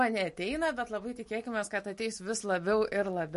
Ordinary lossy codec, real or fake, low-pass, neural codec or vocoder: MP3, 48 kbps; real; 14.4 kHz; none